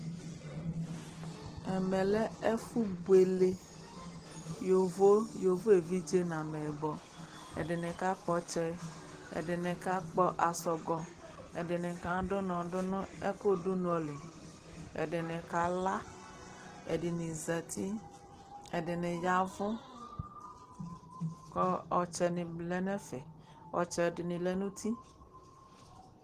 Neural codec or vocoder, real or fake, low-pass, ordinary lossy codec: none; real; 14.4 kHz; Opus, 16 kbps